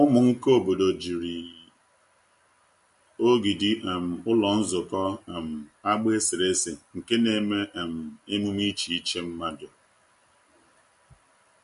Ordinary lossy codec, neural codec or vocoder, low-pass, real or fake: MP3, 48 kbps; none; 10.8 kHz; real